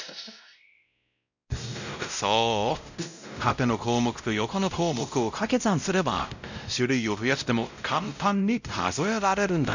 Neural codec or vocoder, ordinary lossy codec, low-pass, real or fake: codec, 16 kHz, 0.5 kbps, X-Codec, WavLM features, trained on Multilingual LibriSpeech; none; 7.2 kHz; fake